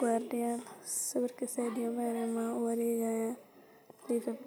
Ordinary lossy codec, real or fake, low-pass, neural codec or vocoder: none; real; none; none